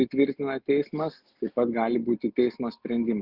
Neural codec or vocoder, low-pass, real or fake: none; 5.4 kHz; real